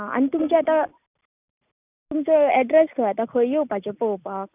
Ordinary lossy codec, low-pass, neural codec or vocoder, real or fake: none; 3.6 kHz; none; real